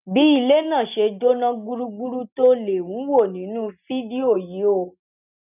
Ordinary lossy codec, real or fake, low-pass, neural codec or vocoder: none; real; 3.6 kHz; none